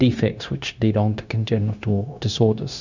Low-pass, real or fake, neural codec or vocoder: 7.2 kHz; fake; codec, 16 kHz, 0.9 kbps, LongCat-Audio-Codec